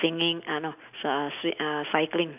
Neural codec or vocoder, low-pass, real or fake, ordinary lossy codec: none; 3.6 kHz; real; none